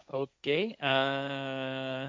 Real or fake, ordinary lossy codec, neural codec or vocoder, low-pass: fake; none; codec, 16 kHz, 1.1 kbps, Voila-Tokenizer; none